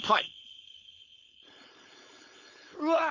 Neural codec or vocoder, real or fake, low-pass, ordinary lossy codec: codec, 16 kHz, 4.8 kbps, FACodec; fake; 7.2 kHz; none